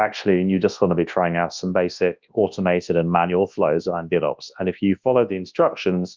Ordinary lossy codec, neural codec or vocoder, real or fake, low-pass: Opus, 32 kbps; codec, 24 kHz, 0.9 kbps, WavTokenizer, large speech release; fake; 7.2 kHz